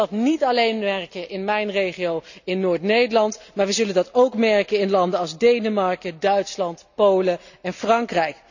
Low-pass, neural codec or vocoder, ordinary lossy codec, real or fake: 7.2 kHz; none; none; real